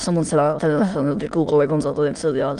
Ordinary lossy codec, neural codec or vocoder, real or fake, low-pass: Opus, 24 kbps; autoencoder, 22.05 kHz, a latent of 192 numbers a frame, VITS, trained on many speakers; fake; 9.9 kHz